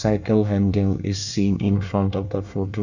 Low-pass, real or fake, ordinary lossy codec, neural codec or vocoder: 7.2 kHz; fake; none; codec, 24 kHz, 1 kbps, SNAC